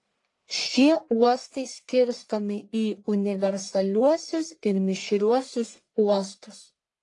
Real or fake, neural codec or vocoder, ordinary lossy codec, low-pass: fake; codec, 44.1 kHz, 1.7 kbps, Pupu-Codec; AAC, 48 kbps; 10.8 kHz